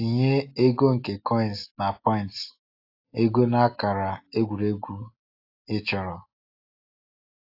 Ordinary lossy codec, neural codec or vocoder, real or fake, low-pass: AAC, 32 kbps; none; real; 5.4 kHz